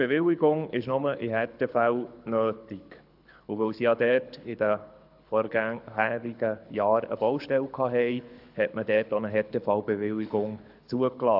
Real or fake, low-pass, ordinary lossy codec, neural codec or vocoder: fake; 5.4 kHz; AAC, 48 kbps; codec, 24 kHz, 6 kbps, HILCodec